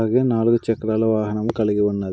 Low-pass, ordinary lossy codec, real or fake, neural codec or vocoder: none; none; real; none